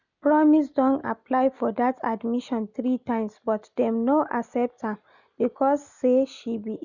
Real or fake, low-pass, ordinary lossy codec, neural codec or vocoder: real; 7.2 kHz; none; none